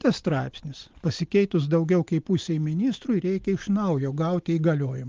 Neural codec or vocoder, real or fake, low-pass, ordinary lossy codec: none; real; 7.2 kHz; Opus, 32 kbps